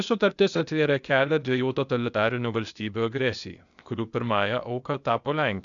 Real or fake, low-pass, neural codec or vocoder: fake; 7.2 kHz; codec, 16 kHz, 0.8 kbps, ZipCodec